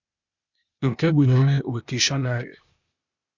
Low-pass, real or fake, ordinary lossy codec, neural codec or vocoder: 7.2 kHz; fake; Opus, 64 kbps; codec, 16 kHz, 0.8 kbps, ZipCodec